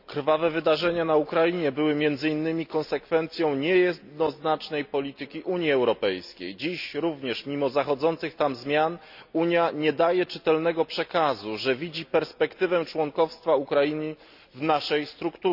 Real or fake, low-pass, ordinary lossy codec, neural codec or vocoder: real; 5.4 kHz; none; none